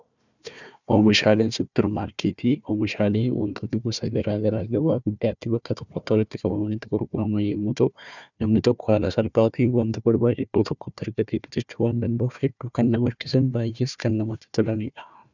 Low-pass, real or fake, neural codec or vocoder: 7.2 kHz; fake; codec, 16 kHz, 1 kbps, FunCodec, trained on Chinese and English, 50 frames a second